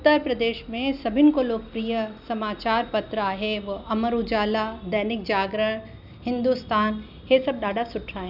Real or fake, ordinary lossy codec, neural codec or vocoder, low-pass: real; none; none; 5.4 kHz